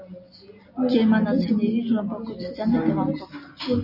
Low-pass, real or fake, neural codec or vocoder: 5.4 kHz; real; none